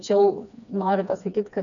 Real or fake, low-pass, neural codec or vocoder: fake; 7.2 kHz; codec, 16 kHz, 2 kbps, FreqCodec, smaller model